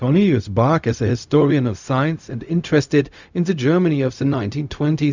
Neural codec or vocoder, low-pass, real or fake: codec, 16 kHz, 0.4 kbps, LongCat-Audio-Codec; 7.2 kHz; fake